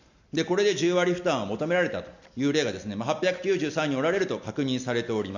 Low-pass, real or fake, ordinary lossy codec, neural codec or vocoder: 7.2 kHz; real; none; none